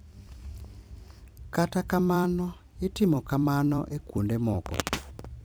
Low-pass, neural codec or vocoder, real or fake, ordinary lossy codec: none; vocoder, 44.1 kHz, 128 mel bands every 256 samples, BigVGAN v2; fake; none